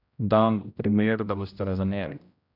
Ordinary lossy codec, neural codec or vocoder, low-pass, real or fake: none; codec, 16 kHz, 0.5 kbps, X-Codec, HuBERT features, trained on general audio; 5.4 kHz; fake